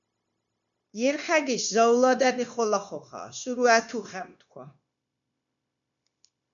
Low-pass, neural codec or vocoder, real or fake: 7.2 kHz; codec, 16 kHz, 0.9 kbps, LongCat-Audio-Codec; fake